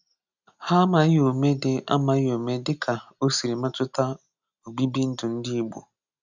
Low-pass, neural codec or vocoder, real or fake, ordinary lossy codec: 7.2 kHz; none; real; none